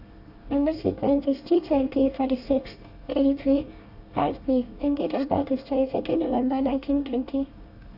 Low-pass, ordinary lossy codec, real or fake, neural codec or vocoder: 5.4 kHz; AAC, 48 kbps; fake; codec, 24 kHz, 1 kbps, SNAC